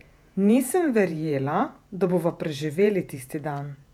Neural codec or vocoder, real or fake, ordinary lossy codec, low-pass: vocoder, 48 kHz, 128 mel bands, Vocos; fake; none; 19.8 kHz